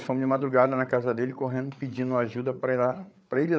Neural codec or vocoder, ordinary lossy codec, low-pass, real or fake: codec, 16 kHz, 8 kbps, FreqCodec, larger model; none; none; fake